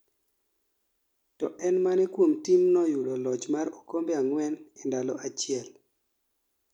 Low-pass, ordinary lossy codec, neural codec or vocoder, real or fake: 19.8 kHz; none; none; real